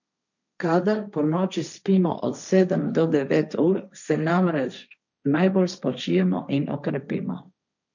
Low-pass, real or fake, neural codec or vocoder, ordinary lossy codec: 7.2 kHz; fake; codec, 16 kHz, 1.1 kbps, Voila-Tokenizer; none